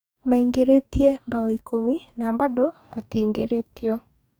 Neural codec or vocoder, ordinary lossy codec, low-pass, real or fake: codec, 44.1 kHz, 2.6 kbps, DAC; none; none; fake